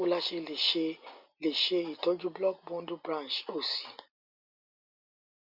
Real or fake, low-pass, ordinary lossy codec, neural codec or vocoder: real; 5.4 kHz; Opus, 64 kbps; none